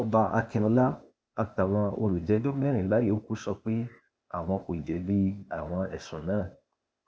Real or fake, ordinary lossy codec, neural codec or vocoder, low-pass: fake; none; codec, 16 kHz, 0.8 kbps, ZipCodec; none